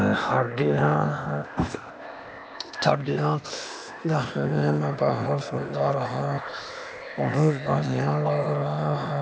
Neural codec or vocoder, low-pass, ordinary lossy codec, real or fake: codec, 16 kHz, 0.8 kbps, ZipCodec; none; none; fake